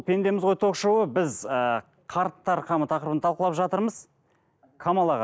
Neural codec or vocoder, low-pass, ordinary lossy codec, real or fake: none; none; none; real